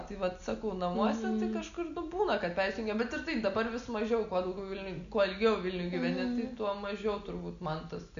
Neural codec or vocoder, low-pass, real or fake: none; 7.2 kHz; real